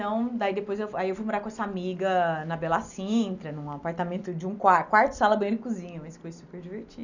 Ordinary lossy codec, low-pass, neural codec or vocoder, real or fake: none; 7.2 kHz; none; real